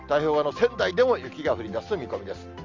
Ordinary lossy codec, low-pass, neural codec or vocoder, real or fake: Opus, 32 kbps; 7.2 kHz; none; real